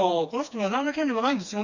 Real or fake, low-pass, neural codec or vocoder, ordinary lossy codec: fake; 7.2 kHz; codec, 16 kHz, 2 kbps, FreqCodec, smaller model; none